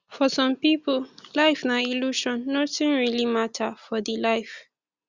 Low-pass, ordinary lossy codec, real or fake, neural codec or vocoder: 7.2 kHz; Opus, 64 kbps; real; none